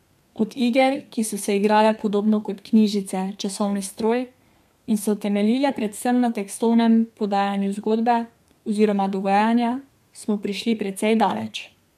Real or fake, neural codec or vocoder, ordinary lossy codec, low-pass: fake; codec, 32 kHz, 1.9 kbps, SNAC; MP3, 96 kbps; 14.4 kHz